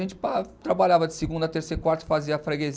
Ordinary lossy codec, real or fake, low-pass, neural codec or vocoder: none; real; none; none